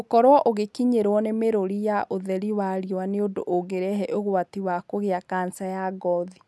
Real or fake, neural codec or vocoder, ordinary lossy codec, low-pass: real; none; none; none